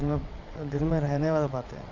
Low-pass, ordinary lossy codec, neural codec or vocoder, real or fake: 7.2 kHz; none; vocoder, 22.05 kHz, 80 mel bands, WaveNeXt; fake